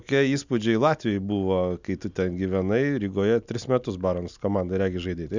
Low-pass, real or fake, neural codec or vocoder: 7.2 kHz; real; none